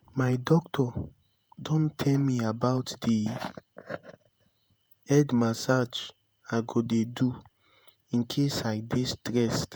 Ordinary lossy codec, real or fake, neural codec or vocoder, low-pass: none; fake; vocoder, 48 kHz, 128 mel bands, Vocos; none